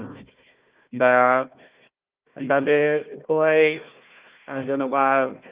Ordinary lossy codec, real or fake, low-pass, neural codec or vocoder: Opus, 32 kbps; fake; 3.6 kHz; codec, 16 kHz, 1 kbps, FunCodec, trained on Chinese and English, 50 frames a second